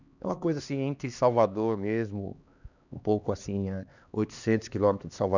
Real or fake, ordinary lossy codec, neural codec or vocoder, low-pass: fake; none; codec, 16 kHz, 2 kbps, X-Codec, HuBERT features, trained on LibriSpeech; 7.2 kHz